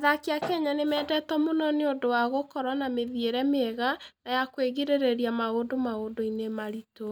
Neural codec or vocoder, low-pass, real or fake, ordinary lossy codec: none; none; real; none